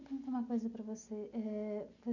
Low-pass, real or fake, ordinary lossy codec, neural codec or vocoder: 7.2 kHz; real; none; none